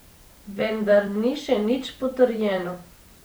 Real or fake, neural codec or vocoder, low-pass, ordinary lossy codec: fake; vocoder, 44.1 kHz, 128 mel bands every 512 samples, BigVGAN v2; none; none